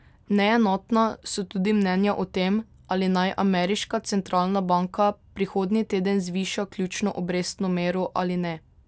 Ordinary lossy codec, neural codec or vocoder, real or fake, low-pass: none; none; real; none